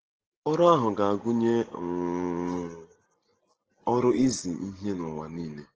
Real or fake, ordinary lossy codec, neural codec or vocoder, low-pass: real; Opus, 16 kbps; none; 7.2 kHz